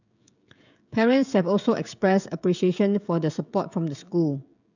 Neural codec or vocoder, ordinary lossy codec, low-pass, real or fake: codec, 16 kHz, 16 kbps, FreqCodec, smaller model; none; 7.2 kHz; fake